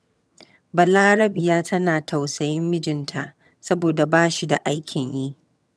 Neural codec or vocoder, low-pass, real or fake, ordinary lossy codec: vocoder, 22.05 kHz, 80 mel bands, HiFi-GAN; none; fake; none